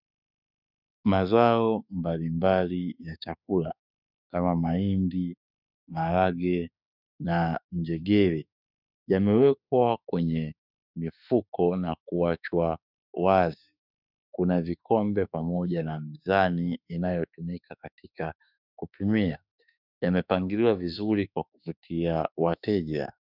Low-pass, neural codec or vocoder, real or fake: 5.4 kHz; autoencoder, 48 kHz, 32 numbers a frame, DAC-VAE, trained on Japanese speech; fake